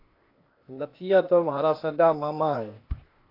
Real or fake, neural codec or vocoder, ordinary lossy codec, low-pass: fake; codec, 16 kHz, 0.8 kbps, ZipCodec; AAC, 32 kbps; 5.4 kHz